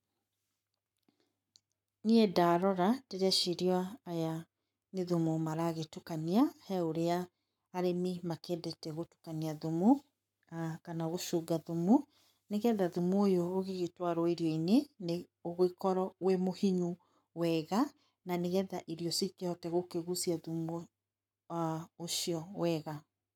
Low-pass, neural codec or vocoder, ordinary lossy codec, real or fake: 19.8 kHz; codec, 44.1 kHz, 7.8 kbps, Pupu-Codec; none; fake